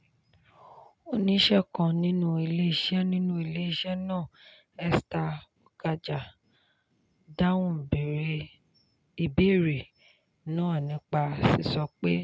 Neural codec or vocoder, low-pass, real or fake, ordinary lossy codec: none; none; real; none